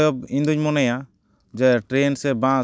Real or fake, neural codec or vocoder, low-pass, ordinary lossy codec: real; none; none; none